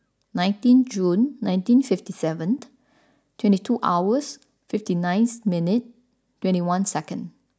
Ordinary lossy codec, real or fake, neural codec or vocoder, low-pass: none; real; none; none